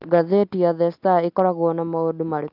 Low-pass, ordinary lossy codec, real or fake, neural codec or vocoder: 5.4 kHz; Opus, 32 kbps; real; none